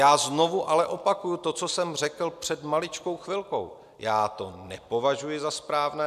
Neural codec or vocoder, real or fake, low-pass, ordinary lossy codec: none; real; 14.4 kHz; MP3, 96 kbps